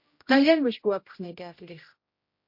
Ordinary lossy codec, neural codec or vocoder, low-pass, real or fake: MP3, 32 kbps; codec, 16 kHz, 0.5 kbps, X-Codec, HuBERT features, trained on general audio; 5.4 kHz; fake